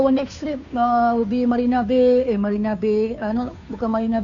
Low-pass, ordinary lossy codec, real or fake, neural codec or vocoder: 7.2 kHz; none; fake; codec, 16 kHz, 2 kbps, FunCodec, trained on Chinese and English, 25 frames a second